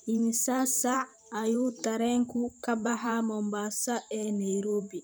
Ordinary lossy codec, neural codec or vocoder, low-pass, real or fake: none; vocoder, 44.1 kHz, 128 mel bands every 512 samples, BigVGAN v2; none; fake